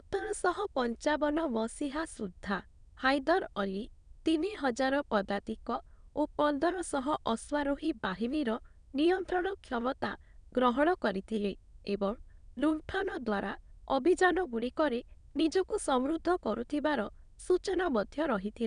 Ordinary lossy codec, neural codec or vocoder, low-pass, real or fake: none; autoencoder, 22.05 kHz, a latent of 192 numbers a frame, VITS, trained on many speakers; 9.9 kHz; fake